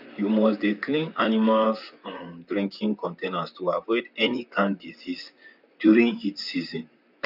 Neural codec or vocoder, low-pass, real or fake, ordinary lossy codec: vocoder, 44.1 kHz, 128 mel bands, Pupu-Vocoder; 5.4 kHz; fake; none